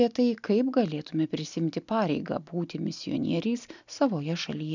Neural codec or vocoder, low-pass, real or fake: none; 7.2 kHz; real